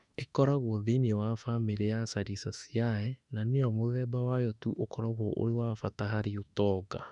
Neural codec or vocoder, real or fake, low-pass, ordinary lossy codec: autoencoder, 48 kHz, 32 numbers a frame, DAC-VAE, trained on Japanese speech; fake; 10.8 kHz; none